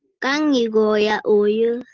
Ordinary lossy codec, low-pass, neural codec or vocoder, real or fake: Opus, 16 kbps; 7.2 kHz; none; real